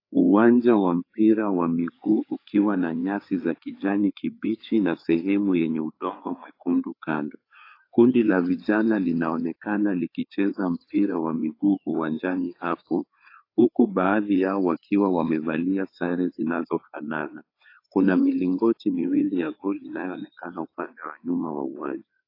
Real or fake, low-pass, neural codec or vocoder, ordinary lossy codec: fake; 5.4 kHz; codec, 16 kHz, 4 kbps, FreqCodec, larger model; AAC, 32 kbps